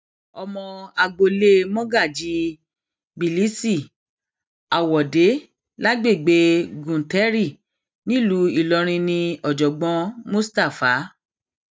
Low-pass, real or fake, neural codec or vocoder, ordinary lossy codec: none; real; none; none